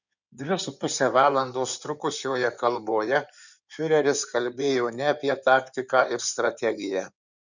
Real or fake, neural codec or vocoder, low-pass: fake; codec, 16 kHz in and 24 kHz out, 2.2 kbps, FireRedTTS-2 codec; 7.2 kHz